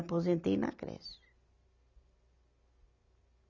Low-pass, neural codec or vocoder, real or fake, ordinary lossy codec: 7.2 kHz; none; real; none